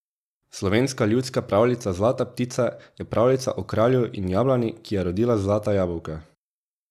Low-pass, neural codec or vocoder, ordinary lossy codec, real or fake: 14.4 kHz; none; none; real